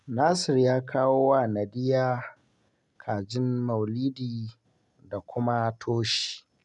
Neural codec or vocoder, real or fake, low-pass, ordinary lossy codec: none; real; 10.8 kHz; none